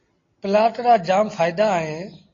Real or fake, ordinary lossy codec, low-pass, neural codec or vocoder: real; AAC, 32 kbps; 7.2 kHz; none